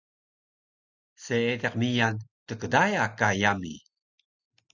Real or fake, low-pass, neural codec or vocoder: real; 7.2 kHz; none